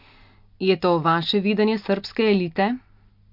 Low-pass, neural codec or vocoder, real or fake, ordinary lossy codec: 5.4 kHz; none; real; MP3, 48 kbps